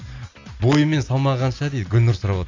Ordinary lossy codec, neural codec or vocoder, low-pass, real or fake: AAC, 48 kbps; none; 7.2 kHz; real